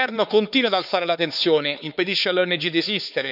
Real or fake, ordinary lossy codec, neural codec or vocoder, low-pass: fake; none; codec, 16 kHz, 4 kbps, X-Codec, HuBERT features, trained on LibriSpeech; 5.4 kHz